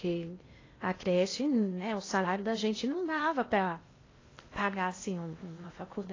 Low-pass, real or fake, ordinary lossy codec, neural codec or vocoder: 7.2 kHz; fake; AAC, 32 kbps; codec, 16 kHz in and 24 kHz out, 0.8 kbps, FocalCodec, streaming, 65536 codes